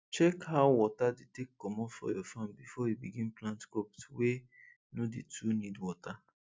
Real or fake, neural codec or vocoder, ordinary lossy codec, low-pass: real; none; none; none